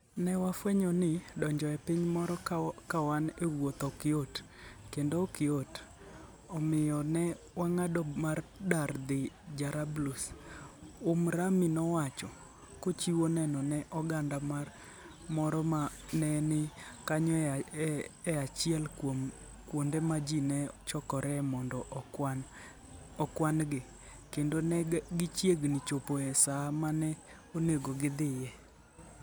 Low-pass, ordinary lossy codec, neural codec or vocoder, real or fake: none; none; none; real